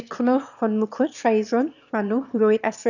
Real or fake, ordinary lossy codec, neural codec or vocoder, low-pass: fake; none; autoencoder, 22.05 kHz, a latent of 192 numbers a frame, VITS, trained on one speaker; 7.2 kHz